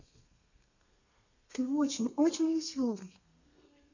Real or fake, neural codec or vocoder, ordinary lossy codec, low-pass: fake; codec, 32 kHz, 1.9 kbps, SNAC; none; 7.2 kHz